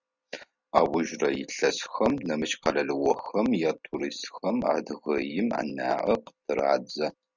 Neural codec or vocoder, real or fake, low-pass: none; real; 7.2 kHz